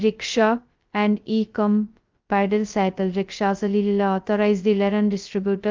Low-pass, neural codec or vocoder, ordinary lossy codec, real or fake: 7.2 kHz; codec, 16 kHz, 0.2 kbps, FocalCodec; Opus, 32 kbps; fake